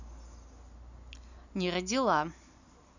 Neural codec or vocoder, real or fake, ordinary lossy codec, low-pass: none; real; none; 7.2 kHz